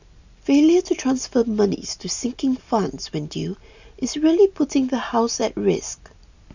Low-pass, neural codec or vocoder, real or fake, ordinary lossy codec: 7.2 kHz; none; real; none